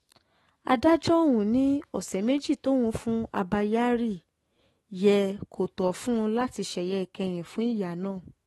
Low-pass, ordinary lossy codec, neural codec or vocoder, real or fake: 19.8 kHz; AAC, 32 kbps; autoencoder, 48 kHz, 128 numbers a frame, DAC-VAE, trained on Japanese speech; fake